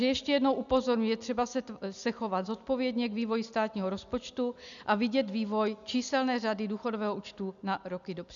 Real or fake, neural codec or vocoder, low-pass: real; none; 7.2 kHz